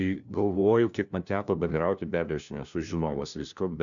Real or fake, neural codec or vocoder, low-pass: fake; codec, 16 kHz, 1 kbps, FunCodec, trained on LibriTTS, 50 frames a second; 7.2 kHz